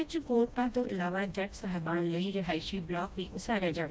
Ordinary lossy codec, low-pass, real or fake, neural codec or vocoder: none; none; fake; codec, 16 kHz, 1 kbps, FreqCodec, smaller model